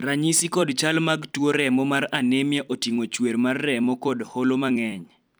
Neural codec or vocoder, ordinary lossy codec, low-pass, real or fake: vocoder, 44.1 kHz, 128 mel bands every 256 samples, BigVGAN v2; none; none; fake